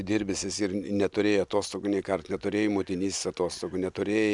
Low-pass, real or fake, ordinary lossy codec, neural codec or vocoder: 10.8 kHz; real; MP3, 96 kbps; none